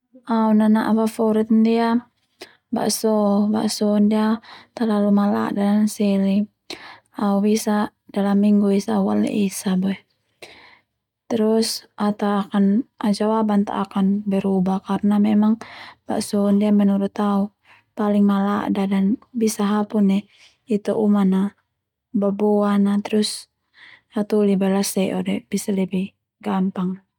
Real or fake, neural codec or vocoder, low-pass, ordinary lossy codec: real; none; 19.8 kHz; none